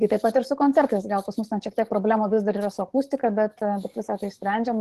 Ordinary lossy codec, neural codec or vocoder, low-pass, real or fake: Opus, 16 kbps; codec, 44.1 kHz, 7.8 kbps, DAC; 14.4 kHz; fake